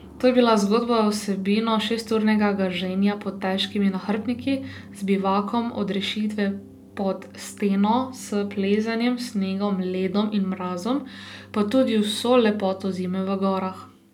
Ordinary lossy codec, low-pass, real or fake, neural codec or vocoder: none; 19.8 kHz; real; none